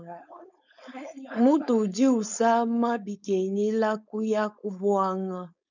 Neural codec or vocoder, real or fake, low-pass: codec, 16 kHz, 4.8 kbps, FACodec; fake; 7.2 kHz